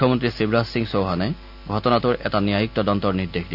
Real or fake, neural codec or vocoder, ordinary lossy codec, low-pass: real; none; none; 5.4 kHz